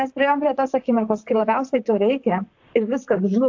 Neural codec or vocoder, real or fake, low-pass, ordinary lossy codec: vocoder, 44.1 kHz, 128 mel bands, Pupu-Vocoder; fake; 7.2 kHz; AAC, 48 kbps